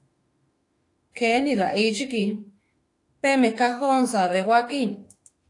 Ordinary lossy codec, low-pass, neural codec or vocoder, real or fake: AAC, 48 kbps; 10.8 kHz; autoencoder, 48 kHz, 32 numbers a frame, DAC-VAE, trained on Japanese speech; fake